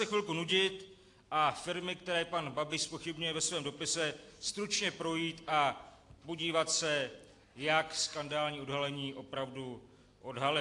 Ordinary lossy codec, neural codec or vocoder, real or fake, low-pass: AAC, 48 kbps; none; real; 10.8 kHz